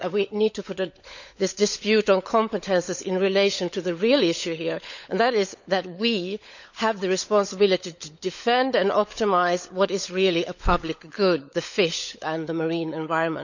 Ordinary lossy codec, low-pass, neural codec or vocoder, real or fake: none; 7.2 kHz; codec, 16 kHz, 16 kbps, FunCodec, trained on LibriTTS, 50 frames a second; fake